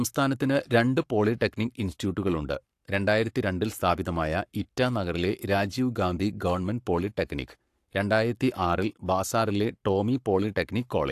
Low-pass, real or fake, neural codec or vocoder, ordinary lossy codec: 14.4 kHz; fake; codec, 44.1 kHz, 7.8 kbps, Pupu-Codec; AAC, 64 kbps